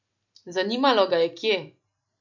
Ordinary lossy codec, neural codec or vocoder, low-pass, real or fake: none; none; 7.2 kHz; real